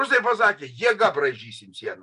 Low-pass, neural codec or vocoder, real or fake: 10.8 kHz; none; real